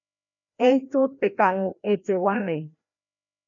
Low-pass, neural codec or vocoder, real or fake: 7.2 kHz; codec, 16 kHz, 1 kbps, FreqCodec, larger model; fake